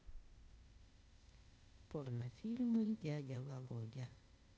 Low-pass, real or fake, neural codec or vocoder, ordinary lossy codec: none; fake; codec, 16 kHz, 0.8 kbps, ZipCodec; none